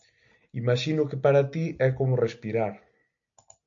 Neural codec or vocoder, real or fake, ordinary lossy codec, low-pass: none; real; MP3, 64 kbps; 7.2 kHz